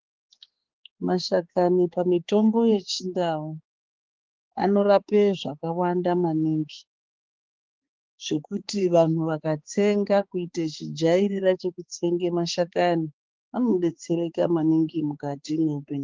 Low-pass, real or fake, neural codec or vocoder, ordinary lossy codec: 7.2 kHz; fake; codec, 16 kHz, 4 kbps, X-Codec, HuBERT features, trained on balanced general audio; Opus, 16 kbps